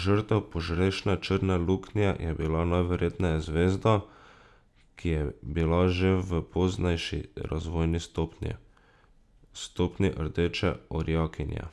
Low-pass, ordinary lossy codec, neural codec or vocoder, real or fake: none; none; none; real